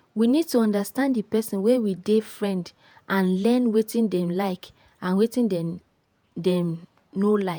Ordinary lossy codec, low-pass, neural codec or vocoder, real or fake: none; none; vocoder, 48 kHz, 128 mel bands, Vocos; fake